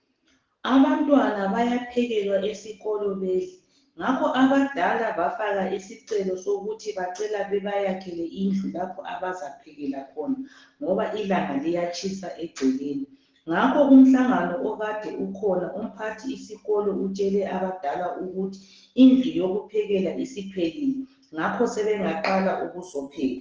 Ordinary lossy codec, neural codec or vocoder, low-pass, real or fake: Opus, 16 kbps; none; 7.2 kHz; real